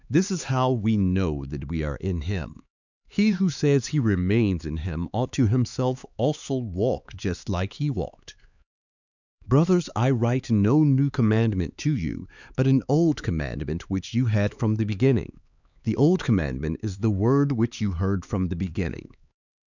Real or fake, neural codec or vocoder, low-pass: fake; codec, 16 kHz, 4 kbps, X-Codec, HuBERT features, trained on LibriSpeech; 7.2 kHz